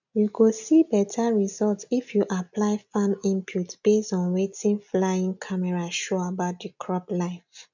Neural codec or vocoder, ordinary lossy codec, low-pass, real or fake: none; none; 7.2 kHz; real